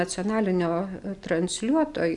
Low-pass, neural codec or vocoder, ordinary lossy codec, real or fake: 10.8 kHz; none; MP3, 96 kbps; real